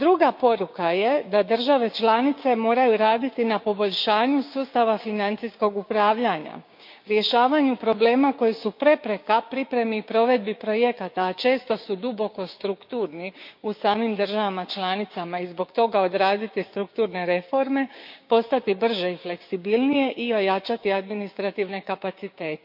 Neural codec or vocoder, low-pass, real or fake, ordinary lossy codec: codec, 16 kHz, 6 kbps, DAC; 5.4 kHz; fake; MP3, 48 kbps